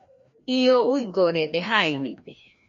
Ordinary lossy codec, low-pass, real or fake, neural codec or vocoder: MP3, 48 kbps; 7.2 kHz; fake; codec, 16 kHz, 1 kbps, FreqCodec, larger model